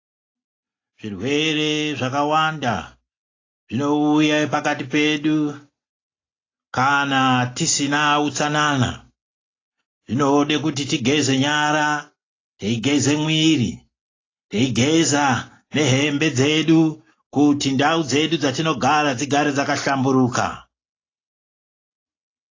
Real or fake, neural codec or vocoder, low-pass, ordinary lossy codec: real; none; 7.2 kHz; AAC, 32 kbps